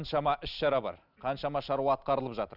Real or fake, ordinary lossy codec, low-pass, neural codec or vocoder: real; none; 5.4 kHz; none